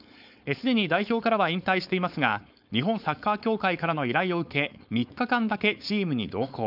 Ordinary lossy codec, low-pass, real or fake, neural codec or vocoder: none; 5.4 kHz; fake; codec, 16 kHz, 4.8 kbps, FACodec